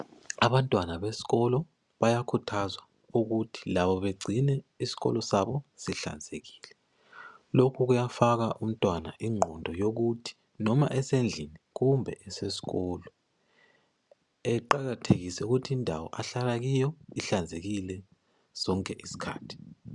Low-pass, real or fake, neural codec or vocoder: 10.8 kHz; real; none